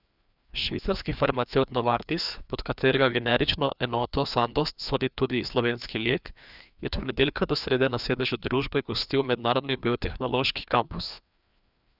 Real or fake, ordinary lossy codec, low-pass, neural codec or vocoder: fake; none; 5.4 kHz; codec, 16 kHz, 2 kbps, FreqCodec, larger model